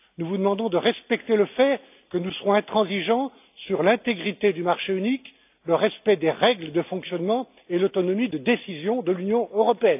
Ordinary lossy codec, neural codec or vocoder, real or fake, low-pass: AAC, 32 kbps; none; real; 3.6 kHz